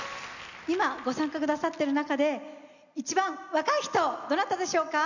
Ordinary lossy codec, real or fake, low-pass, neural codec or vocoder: none; real; 7.2 kHz; none